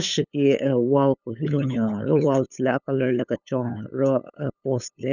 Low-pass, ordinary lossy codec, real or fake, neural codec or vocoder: 7.2 kHz; none; fake; codec, 16 kHz, 8 kbps, FunCodec, trained on LibriTTS, 25 frames a second